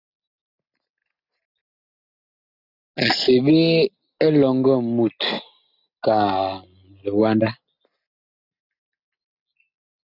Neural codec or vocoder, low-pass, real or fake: none; 5.4 kHz; real